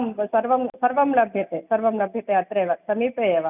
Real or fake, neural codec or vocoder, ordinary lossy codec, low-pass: real; none; none; 3.6 kHz